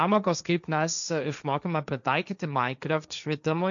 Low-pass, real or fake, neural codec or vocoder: 7.2 kHz; fake; codec, 16 kHz, 1.1 kbps, Voila-Tokenizer